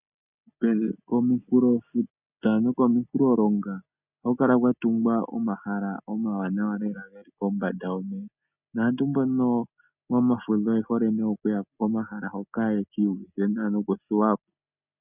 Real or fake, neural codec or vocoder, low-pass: real; none; 3.6 kHz